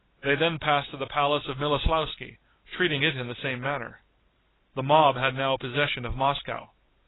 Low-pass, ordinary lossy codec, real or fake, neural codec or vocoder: 7.2 kHz; AAC, 16 kbps; real; none